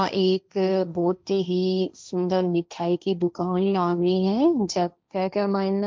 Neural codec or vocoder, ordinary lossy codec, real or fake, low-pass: codec, 16 kHz, 1.1 kbps, Voila-Tokenizer; none; fake; none